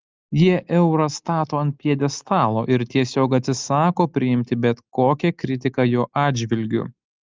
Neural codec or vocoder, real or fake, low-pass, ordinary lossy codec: none; real; 7.2 kHz; Opus, 32 kbps